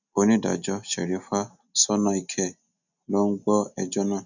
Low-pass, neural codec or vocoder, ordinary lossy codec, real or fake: 7.2 kHz; none; none; real